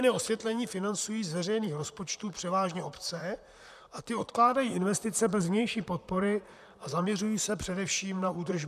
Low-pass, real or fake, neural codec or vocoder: 14.4 kHz; fake; vocoder, 44.1 kHz, 128 mel bands, Pupu-Vocoder